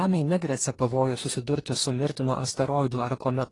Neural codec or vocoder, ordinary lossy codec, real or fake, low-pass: codec, 44.1 kHz, 2.6 kbps, DAC; AAC, 32 kbps; fake; 10.8 kHz